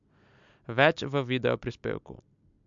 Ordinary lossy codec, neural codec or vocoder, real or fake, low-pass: MP3, 64 kbps; none; real; 7.2 kHz